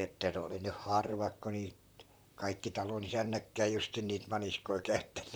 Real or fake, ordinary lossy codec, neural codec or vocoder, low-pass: fake; none; vocoder, 44.1 kHz, 128 mel bands, Pupu-Vocoder; none